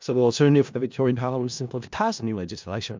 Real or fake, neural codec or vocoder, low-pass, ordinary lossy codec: fake; codec, 16 kHz in and 24 kHz out, 0.4 kbps, LongCat-Audio-Codec, four codebook decoder; 7.2 kHz; MP3, 64 kbps